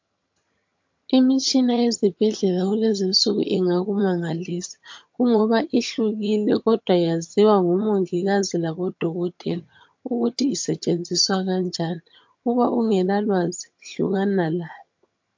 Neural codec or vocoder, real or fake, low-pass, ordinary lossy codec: vocoder, 22.05 kHz, 80 mel bands, HiFi-GAN; fake; 7.2 kHz; MP3, 48 kbps